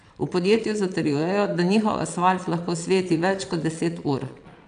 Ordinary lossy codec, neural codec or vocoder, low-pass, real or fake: none; vocoder, 22.05 kHz, 80 mel bands, Vocos; 9.9 kHz; fake